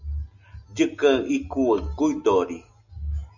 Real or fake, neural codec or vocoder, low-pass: real; none; 7.2 kHz